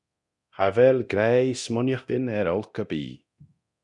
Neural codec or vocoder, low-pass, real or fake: codec, 24 kHz, 0.9 kbps, DualCodec; 10.8 kHz; fake